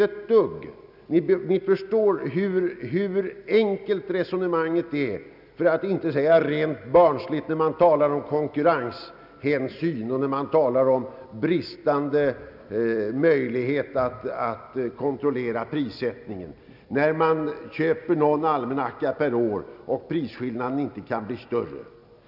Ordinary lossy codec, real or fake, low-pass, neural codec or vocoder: none; real; 5.4 kHz; none